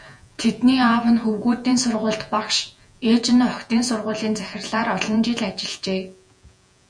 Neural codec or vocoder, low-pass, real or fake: vocoder, 48 kHz, 128 mel bands, Vocos; 9.9 kHz; fake